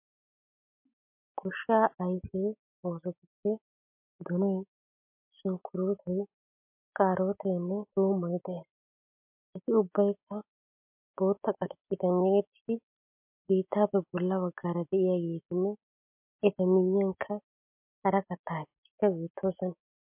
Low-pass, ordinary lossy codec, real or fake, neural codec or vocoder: 3.6 kHz; MP3, 32 kbps; real; none